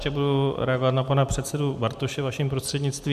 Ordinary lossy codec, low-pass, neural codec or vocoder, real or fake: MP3, 96 kbps; 14.4 kHz; none; real